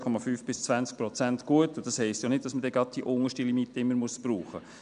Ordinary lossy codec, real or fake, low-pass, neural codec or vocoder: none; real; 9.9 kHz; none